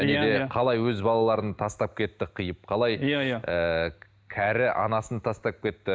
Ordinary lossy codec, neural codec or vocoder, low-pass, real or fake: none; none; none; real